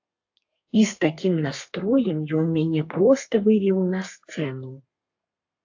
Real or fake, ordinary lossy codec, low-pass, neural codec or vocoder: fake; AAC, 48 kbps; 7.2 kHz; codec, 32 kHz, 1.9 kbps, SNAC